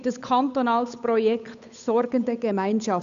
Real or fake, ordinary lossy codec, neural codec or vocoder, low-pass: fake; none; codec, 16 kHz, 8 kbps, FunCodec, trained on Chinese and English, 25 frames a second; 7.2 kHz